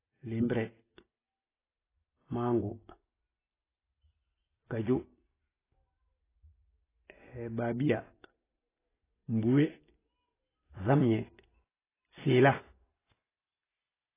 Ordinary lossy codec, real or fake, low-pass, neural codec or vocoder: AAC, 16 kbps; real; 3.6 kHz; none